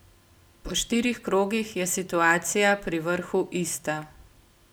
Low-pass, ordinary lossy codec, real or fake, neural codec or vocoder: none; none; real; none